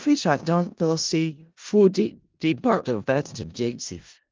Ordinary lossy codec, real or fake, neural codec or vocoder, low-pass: Opus, 24 kbps; fake; codec, 16 kHz in and 24 kHz out, 0.4 kbps, LongCat-Audio-Codec, four codebook decoder; 7.2 kHz